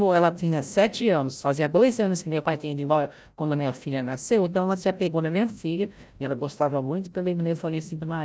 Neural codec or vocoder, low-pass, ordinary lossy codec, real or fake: codec, 16 kHz, 0.5 kbps, FreqCodec, larger model; none; none; fake